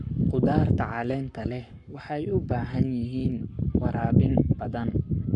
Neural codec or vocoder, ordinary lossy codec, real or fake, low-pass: codec, 44.1 kHz, 7.8 kbps, Pupu-Codec; MP3, 64 kbps; fake; 10.8 kHz